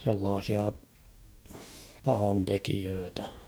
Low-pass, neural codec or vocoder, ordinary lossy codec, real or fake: none; codec, 44.1 kHz, 2.6 kbps, DAC; none; fake